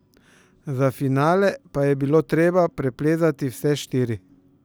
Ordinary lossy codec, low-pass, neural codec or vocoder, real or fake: none; none; none; real